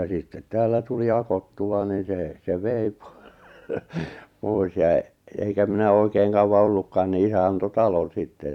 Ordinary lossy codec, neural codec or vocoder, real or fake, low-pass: none; vocoder, 44.1 kHz, 128 mel bands every 256 samples, BigVGAN v2; fake; 19.8 kHz